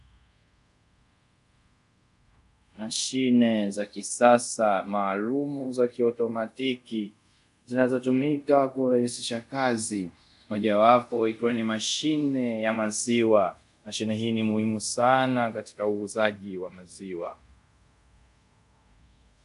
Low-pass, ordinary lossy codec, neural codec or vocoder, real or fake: 10.8 kHz; MP3, 96 kbps; codec, 24 kHz, 0.5 kbps, DualCodec; fake